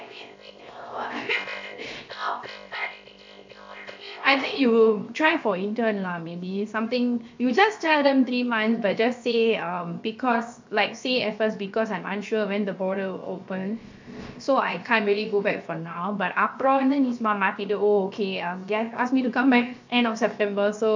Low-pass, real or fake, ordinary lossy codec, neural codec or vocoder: 7.2 kHz; fake; MP3, 48 kbps; codec, 16 kHz, 0.7 kbps, FocalCodec